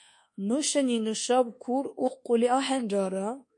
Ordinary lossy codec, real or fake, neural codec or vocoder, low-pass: MP3, 48 kbps; fake; codec, 24 kHz, 1.2 kbps, DualCodec; 10.8 kHz